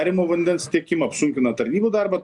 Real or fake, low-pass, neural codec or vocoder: real; 10.8 kHz; none